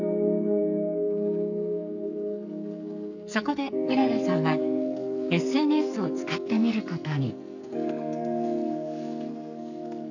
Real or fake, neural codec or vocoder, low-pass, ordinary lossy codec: fake; codec, 44.1 kHz, 2.6 kbps, SNAC; 7.2 kHz; none